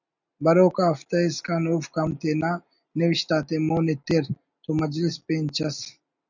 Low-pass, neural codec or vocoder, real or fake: 7.2 kHz; none; real